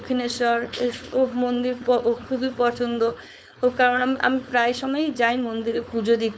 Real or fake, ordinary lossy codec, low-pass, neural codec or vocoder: fake; none; none; codec, 16 kHz, 4.8 kbps, FACodec